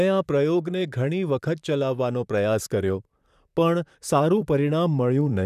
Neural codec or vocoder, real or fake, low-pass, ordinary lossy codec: vocoder, 44.1 kHz, 128 mel bands, Pupu-Vocoder; fake; 14.4 kHz; none